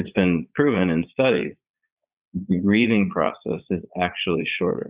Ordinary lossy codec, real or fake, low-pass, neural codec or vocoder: Opus, 64 kbps; fake; 3.6 kHz; vocoder, 22.05 kHz, 80 mel bands, Vocos